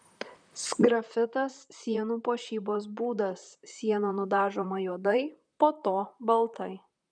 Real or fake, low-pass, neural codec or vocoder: fake; 9.9 kHz; vocoder, 44.1 kHz, 128 mel bands, Pupu-Vocoder